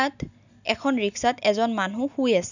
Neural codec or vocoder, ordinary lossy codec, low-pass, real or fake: none; none; 7.2 kHz; real